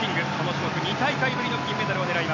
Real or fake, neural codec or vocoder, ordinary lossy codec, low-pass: real; none; none; 7.2 kHz